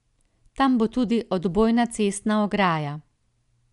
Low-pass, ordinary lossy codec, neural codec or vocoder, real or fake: 10.8 kHz; none; none; real